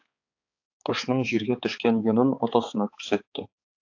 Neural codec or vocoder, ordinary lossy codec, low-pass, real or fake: codec, 16 kHz, 4 kbps, X-Codec, HuBERT features, trained on balanced general audio; AAC, 48 kbps; 7.2 kHz; fake